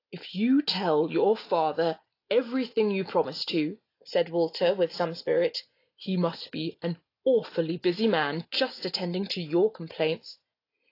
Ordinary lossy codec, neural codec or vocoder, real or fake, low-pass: AAC, 32 kbps; none; real; 5.4 kHz